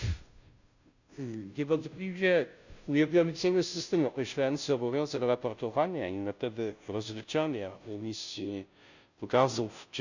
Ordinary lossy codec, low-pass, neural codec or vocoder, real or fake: none; 7.2 kHz; codec, 16 kHz, 0.5 kbps, FunCodec, trained on Chinese and English, 25 frames a second; fake